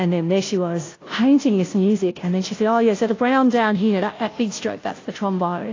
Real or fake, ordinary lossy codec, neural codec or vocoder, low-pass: fake; AAC, 32 kbps; codec, 16 kHz, 0.5 kbps, FunCodec, trained on Chinese and English, 25 frames a second; 7.2 kHz